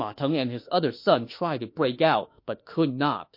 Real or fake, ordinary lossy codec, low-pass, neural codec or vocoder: fake; MP3, 32 kbps; 5.4 kHz; autoencoder, 48 kHz, 32 numbers a frame, DAC-VAE, trained on Japanese speech